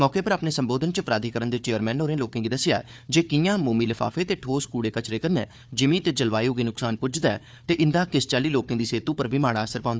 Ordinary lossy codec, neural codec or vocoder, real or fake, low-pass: none; codec, 16 kHz, 4 kbps, FunCodec, trained on Chinese and English, 50 frames a second; fake; none